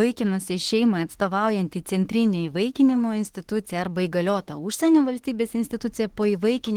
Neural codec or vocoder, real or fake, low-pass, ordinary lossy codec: autoencoder, 48 kHz, 32 numbers a frame, DAC-VAE, trained on Japanese speech; fake; 19.8 kHz; Opus, 16 kbps